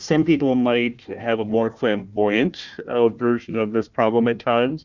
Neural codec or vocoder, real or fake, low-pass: codec, 16 kHz, 1 kbps, FunCodec, trained on Chinese and English, 50 frames a second; fake; 7.2 kHz